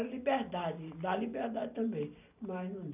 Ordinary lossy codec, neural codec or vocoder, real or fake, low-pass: none; none; real; 3.6 kHz